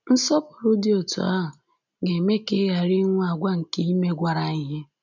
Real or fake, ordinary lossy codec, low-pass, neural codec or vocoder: real; none; 7.2 kHz; none